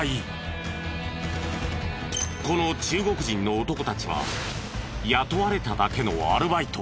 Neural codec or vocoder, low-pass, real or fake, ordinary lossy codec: none; none; real; none